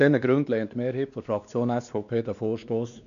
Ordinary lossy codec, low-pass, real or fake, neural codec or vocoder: none; 7.2 kHz; fake; codec, 16 kHz, 2 kbps, X-Codec, WavLM features, trained on Multilingual LibriSpeech